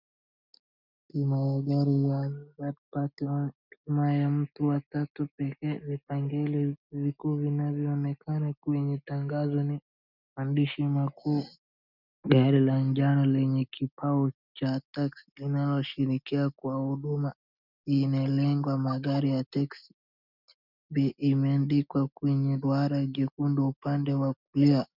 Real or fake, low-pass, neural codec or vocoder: real; 5.4 kHz; none